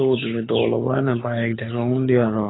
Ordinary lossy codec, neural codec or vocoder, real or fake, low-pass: AAC, 16 kbps; codec, 24 kHz, 6 kbps, HILCodec; fake; 7.2 kHz